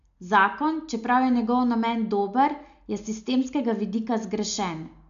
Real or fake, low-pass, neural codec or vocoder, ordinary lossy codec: real; 7.2 kHz; none; MP3, 64 kbps